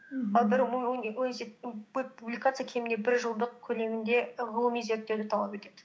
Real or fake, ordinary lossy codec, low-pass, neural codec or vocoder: fake; none; none; codec, 16 kHz, 6 kbps, DAC